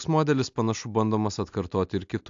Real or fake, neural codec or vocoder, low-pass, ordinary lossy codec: real; none; 7.2 kHz; AAC, 64 kbps